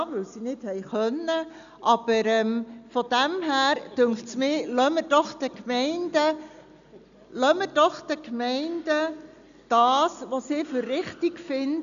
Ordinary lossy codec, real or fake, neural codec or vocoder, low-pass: none; real; none; 7.2 kHz